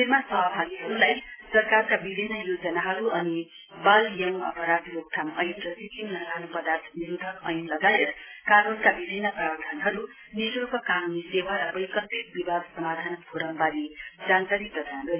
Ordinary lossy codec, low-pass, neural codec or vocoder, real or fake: AAC, 16 kbps; 3.6 kHz; none; real